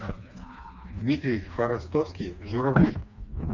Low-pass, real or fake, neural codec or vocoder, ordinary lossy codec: 7.2 kHz; fake; codec, 16 kHz, 2 kbps, FreqCodec, smaller model; none